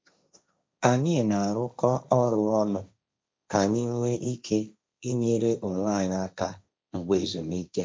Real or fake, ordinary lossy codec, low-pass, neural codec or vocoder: fake; none; none; codec, 16 kHz, 1.1 kbps, Voila-Tokenizer